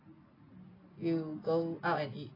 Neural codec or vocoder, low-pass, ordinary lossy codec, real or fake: none; 5.4 kHz; AAC, 24 kbps; real